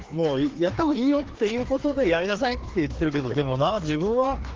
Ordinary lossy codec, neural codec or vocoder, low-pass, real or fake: Opus, 16 kbps; codec, 16 kHz, 2 kbps, FreqCodec, larger model; 7.2 kHz; fake